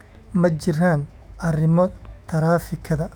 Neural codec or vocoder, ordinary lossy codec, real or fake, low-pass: autoencoder, 48 kHz, 128 numbers a frame, DAC-VAE, trained on Japanese speech; none; fake; 19.8 kHz